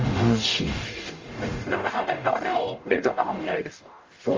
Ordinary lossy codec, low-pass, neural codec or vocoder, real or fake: Opus, 32 kbps; 7.2 kHz; codec, 44.1 kHz, 0.9 kbps, DAC; fake